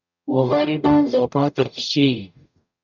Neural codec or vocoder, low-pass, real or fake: codec, 44.1 kHz, 0.9 kbps, DAC; 7.2 kHz; fake